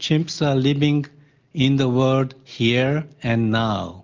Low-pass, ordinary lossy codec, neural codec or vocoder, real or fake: 7.2 kHz; Opus, 32 kbps; none; real